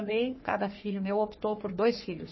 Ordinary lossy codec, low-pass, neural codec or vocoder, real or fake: MP3, 24 kbps; 7.2 kHz; codec, 44.1 kHz, 3.4 kbps, Pupu-Codec; fake